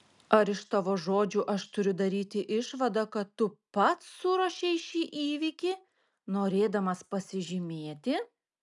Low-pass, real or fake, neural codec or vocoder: 10.8 kHz; real; none